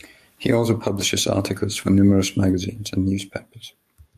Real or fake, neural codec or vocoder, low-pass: fake; codec, 44.1 kHz, 7.8 kbps, DAC; 14.4 kHz